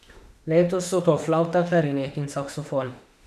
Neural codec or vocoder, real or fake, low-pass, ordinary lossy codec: autoencoder, 48 kHz, 32 numbers a frame, DAC-VAE, trained on Japanese speech; fake; 14.4 kHz; none